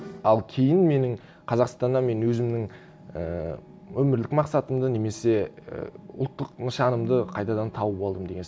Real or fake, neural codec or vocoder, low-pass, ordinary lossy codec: real; none; none; none